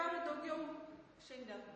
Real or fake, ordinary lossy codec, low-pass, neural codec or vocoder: real; MP3, 32 kbps; 10.8 kHz; none